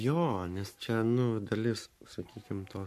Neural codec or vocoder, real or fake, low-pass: codec, 44.1 kHz, 7.8 kbps, Pupu-Codec; fake; 14.4 kHz